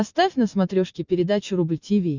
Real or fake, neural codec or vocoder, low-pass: real; none; 7.2 kHz